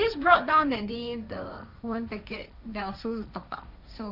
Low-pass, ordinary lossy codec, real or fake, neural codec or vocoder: 5.4 kHz; none; fake; codec, 16 kHz, 1.1 kbps, Voila-Tokenizer